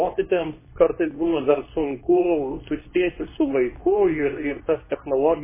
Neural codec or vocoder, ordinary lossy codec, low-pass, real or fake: codec, 24 kHz, 0.9 kbps, WavTokenizer, medium speech release version 2; MP3, 16 kbps; 3.6 kHz; fake